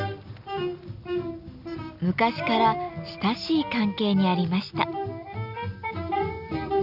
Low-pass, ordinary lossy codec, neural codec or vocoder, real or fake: 5.4 kHz; none; none; real